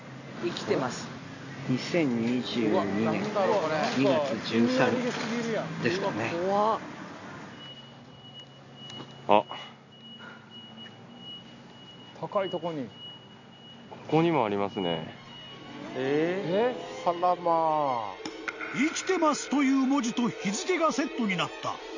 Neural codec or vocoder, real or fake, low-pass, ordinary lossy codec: none; real; 7.2 kHz; none